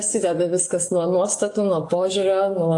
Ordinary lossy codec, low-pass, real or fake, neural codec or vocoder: AAC, 48 kbps; 10.8 kHz; fake; codec, 44.1 kHz, 7.8 kbps, DAC